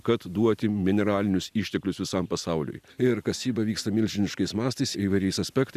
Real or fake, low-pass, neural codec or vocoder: real; 14.4 kHz; none